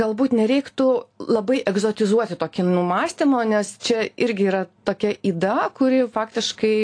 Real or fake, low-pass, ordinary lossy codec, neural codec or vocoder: real; 9.9 kHz; AAC, 48 kbps; none